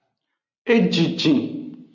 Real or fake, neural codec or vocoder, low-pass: real; none; 7.2 kHz